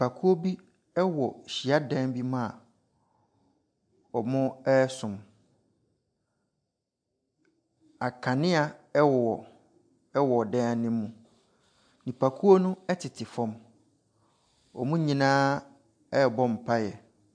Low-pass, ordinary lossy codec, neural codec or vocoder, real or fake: 9.9 kHz; MP3, 64 kbps; none; real